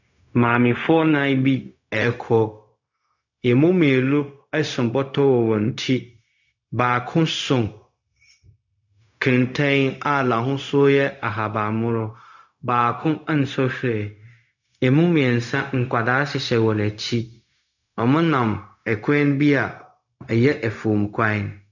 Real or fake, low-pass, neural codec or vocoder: fake; 7.2 kHz; codec, 16 kHz, 0.4 kbps, LongCat-Audio-Codec